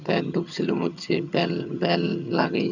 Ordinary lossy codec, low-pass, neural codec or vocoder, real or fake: none; 7.2 kHz; vocoder, 22.05 kHz, 80 mel bands, HiFi-GAN; fake